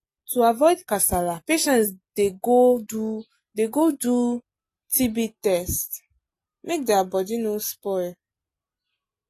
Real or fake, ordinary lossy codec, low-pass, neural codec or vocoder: real; AAC, 48 kbps; 14.4 kHz; none